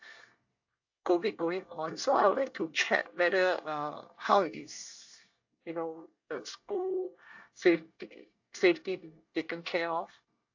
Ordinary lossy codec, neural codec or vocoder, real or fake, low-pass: AAC, 48 kbps; codec, 24 kHz, 1 kbps, SNAC; fake; 7.2 kHz